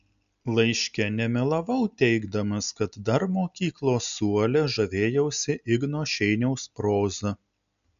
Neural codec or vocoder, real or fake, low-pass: none; real; 7.2 kHz